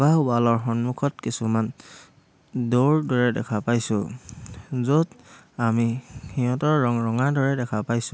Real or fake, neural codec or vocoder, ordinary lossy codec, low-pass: real; none; none; none